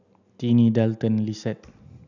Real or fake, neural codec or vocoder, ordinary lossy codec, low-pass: real; none; none; 7.2 kHz